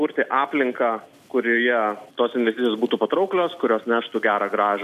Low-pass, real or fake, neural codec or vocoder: 14.4 kHz; real; none